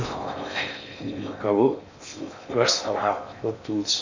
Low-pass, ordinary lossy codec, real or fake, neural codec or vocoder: 7.2 kHz; MP3, 64 kbps; fake; codec, 16 kHz in and 24 kHz out, 0.6 kbps, FocalCodec, streaming, 4096 codes